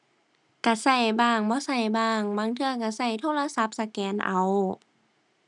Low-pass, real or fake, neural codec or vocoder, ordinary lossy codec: 10.8 kHz; real; none; none